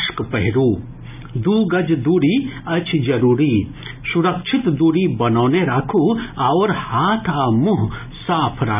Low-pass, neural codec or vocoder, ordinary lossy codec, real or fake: 3.6 kHz; none; none; real